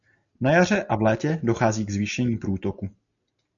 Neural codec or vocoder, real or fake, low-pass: none; real; 7.2 kHz